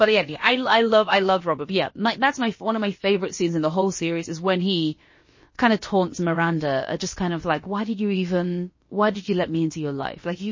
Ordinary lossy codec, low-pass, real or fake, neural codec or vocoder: MP3, 32 kbps; 7.2 kHz; fake; codec, 16 kHz, about 1 kbps, DyCAST, with the encoder's durations